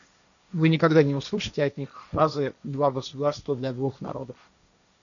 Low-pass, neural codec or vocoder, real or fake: 7.2 kHz; codec, 16 kHz, 1.1 kbps, Voila-Tokenizer; fake